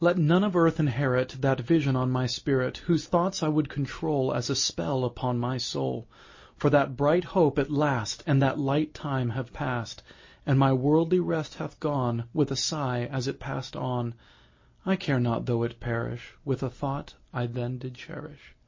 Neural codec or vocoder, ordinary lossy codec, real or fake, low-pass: none; MP3, 32 kbps; real; 7.2 kHz